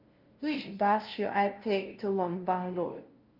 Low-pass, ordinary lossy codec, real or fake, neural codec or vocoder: 5.4 kHz; Opus, 24 kbps; fake; codec, 16 kHz, 0.5 kbps, FunCodec, trained on LibriTTS, 25 frames a second